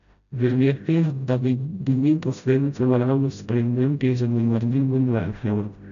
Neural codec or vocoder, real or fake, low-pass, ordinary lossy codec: codec, 16 kHz, 0.5 kbps, FreqCodec, smaller model; fake; 7.2 kHz; MP3, 96 kbps